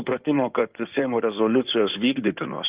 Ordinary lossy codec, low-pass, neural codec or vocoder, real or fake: Opus, 32 kbps; 3.6 kHz; codec, 16 kHz in and 24 kHz out, 2.2 kbps, FireRedTTS-2 codec; fake